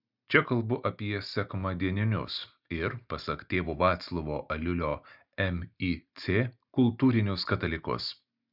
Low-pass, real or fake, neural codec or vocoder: 5.4 kHz; real; none